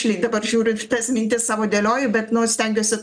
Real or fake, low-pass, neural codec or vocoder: real; 9.9 kHz; none